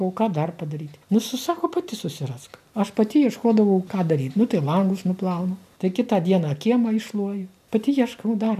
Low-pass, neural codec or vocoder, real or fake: 14.4 kHz; none; real